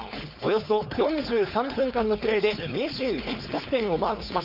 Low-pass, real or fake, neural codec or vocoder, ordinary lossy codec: 5.4 kHz; fake; codec, 16 kHz, 4.8 kbps, FACodec; none